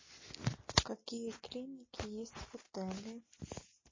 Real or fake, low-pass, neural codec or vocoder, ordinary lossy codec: real; 7.2 kHz; none; MP3, 32 kbps